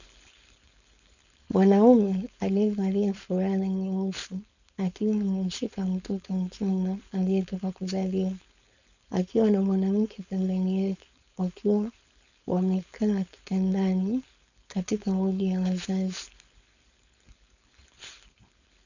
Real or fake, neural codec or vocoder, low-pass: fake; codec, 16 kHz, 4.8 kbps, FACodec; 7.2 kHz